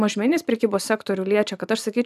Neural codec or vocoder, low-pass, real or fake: vocoder, 44.1 kHz, 128 mel bands every 512 samples, BigVGAN v2; 14.4 kHz; fake